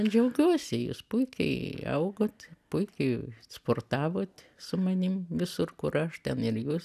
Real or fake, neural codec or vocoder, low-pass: real; none; 14.4 kHz